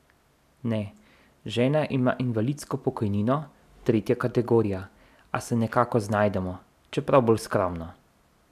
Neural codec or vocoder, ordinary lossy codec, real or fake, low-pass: none; none; real; 14.4 kHz